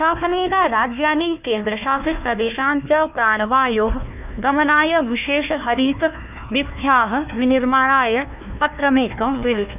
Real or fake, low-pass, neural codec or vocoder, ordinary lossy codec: fake; 3.6 kHz; codec, 16 kHz, 1 kbps, FunCodec, trained on Chinese and English, 50 frames a second; none